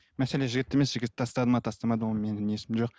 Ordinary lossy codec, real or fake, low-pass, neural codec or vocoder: none; real; none; none